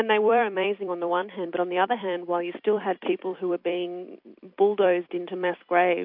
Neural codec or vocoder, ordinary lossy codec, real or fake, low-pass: vocoder, 44.1 kHz, 128 mel bands every 256 samples, BigVGAN v2; MP3, 48 kbps; fake; 5.4 kHz